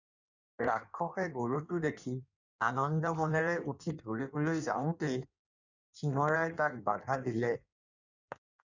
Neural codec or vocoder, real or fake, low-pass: codec, 16 kHz in and 24 kHz out, 1.1 kbps, FireRedTTS-2 codec; fake; 7.2 kHz